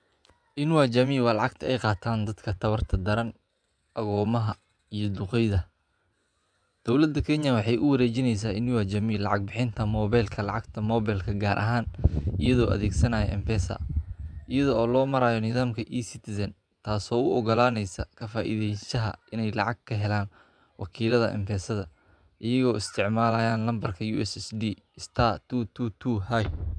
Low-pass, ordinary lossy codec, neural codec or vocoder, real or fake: 9.9 kHz; none; vocoder, 24 kHz, 100 mel bands, Vocos; fake